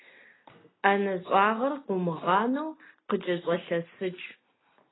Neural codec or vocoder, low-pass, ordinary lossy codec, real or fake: none; 7.2 kHz; AAC, 16 kbps; real